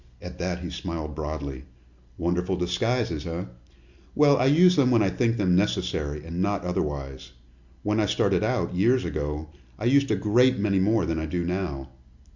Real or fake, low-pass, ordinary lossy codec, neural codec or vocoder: real; 7.2 kHz; Opus, 64 kbps; none